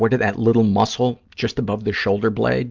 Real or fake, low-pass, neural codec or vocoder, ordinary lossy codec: real; 7.2 kHz; none; Opus, 24 kbps